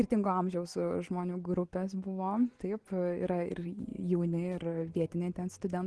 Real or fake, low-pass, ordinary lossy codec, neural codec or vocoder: real; 10.8 kHz; Opus, 16 kbps; none